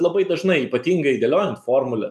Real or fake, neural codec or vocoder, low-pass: real; none; 14.4 kHz